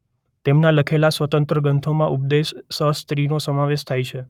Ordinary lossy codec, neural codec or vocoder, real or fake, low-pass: none; codec, 44.1 kHz, 7.8 kbps, Pupu-Codec; fake; 19.8 kHz